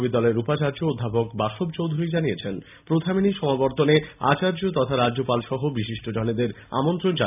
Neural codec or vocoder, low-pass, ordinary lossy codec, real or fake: none; 3.6 kHz; none; real